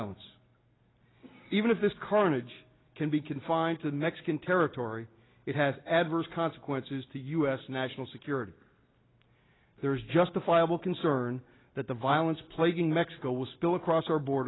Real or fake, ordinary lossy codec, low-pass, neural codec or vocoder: real; AAC, 16 kbps; 7.2 kHz; none